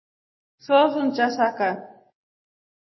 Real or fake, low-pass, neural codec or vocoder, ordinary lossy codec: real; 7.2 kHz; none; MP3, 24 kbps